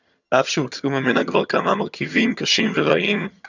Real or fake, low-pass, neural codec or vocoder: fake; 7.2 kHz; vocoder, 22.05 kHz, 80 mel bands, HiFi-GAN